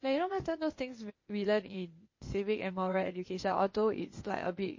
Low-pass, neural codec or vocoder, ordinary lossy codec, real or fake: 7.2 kHz; codec, 16 kHz, 0.8 kbps, ZipCodec; MP3, 32 kbps; fake